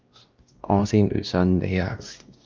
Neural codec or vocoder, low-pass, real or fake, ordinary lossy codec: codec, 16 kHz, 1 kbps, X-Codec, WavLM features, trained on Multilingual LibriSpeech; 7.2 kHz; fake; Opus, 32 kbps